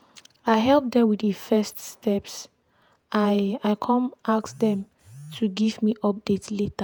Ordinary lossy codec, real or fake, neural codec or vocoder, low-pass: none; fake; vocoder, 48 kHz, 128 mel bands, Vocos; none